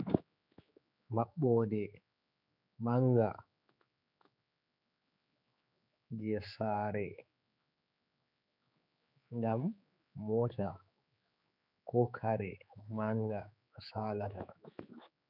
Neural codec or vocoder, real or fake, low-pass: codec, 16 kHz, 4 kbps, X-Codec, HuBERT features, trained on general audio; fake; 5.4 kHz